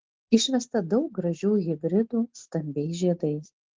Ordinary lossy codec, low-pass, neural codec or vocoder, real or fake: Opus, 16 kbps; 7.2 kHz; none; real